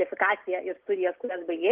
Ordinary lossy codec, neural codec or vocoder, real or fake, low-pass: Opus, 16 kbps; none; real; 3.6 kHz